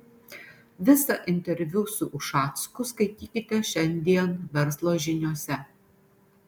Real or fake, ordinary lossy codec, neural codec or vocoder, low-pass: real; MP3, 96 kbps; none; 19.8 kHz